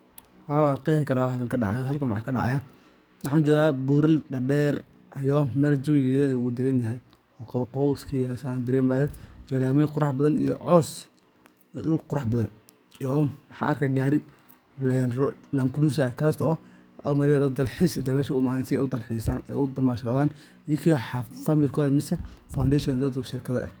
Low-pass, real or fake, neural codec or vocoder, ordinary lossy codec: none; fake; codec, 44.1 kHz, 2.6 kbps, SNAC; none